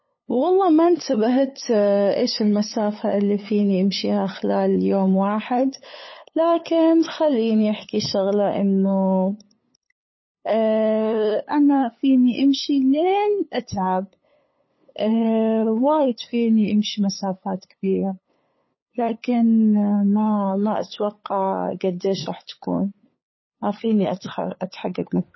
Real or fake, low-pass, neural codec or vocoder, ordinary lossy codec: fake; 7.2 kHz; codec, 16 kHz, 8 kbps, FunCodec, trained on LibriTTS, 25 frames a second; MP3, 24 kbps